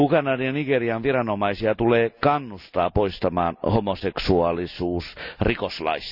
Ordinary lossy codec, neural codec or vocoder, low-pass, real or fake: none; none; 5.4 kHz; real